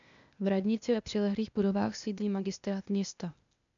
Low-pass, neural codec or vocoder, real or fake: 7.2 kHz; codec, 16 kHz, 0.8 kbps, ZipCodec; fake